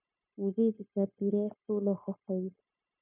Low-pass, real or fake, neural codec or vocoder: 3.6 kHz; fake; codec, 16 kHz, 0.9 kbps, LongCat-Audio-Codec